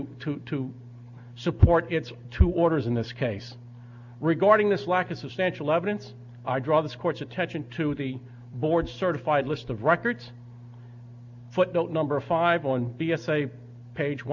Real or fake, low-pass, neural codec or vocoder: real; 7.2 kHz; none